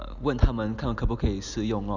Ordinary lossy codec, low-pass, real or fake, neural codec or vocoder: none; 7.2 kHz; real; none